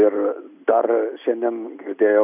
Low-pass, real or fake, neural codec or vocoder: 3.6 kHz; real; none